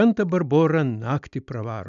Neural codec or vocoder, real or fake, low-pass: none; real; 7.2 kHz